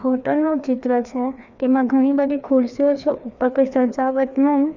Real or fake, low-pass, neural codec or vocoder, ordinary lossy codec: fake; 7.2 kHz; codec, 16 kHz, 2 kbps, FreqCodec, larger model; none